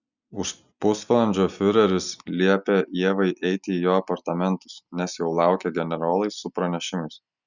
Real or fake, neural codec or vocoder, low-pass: real; none; 7.2 kHz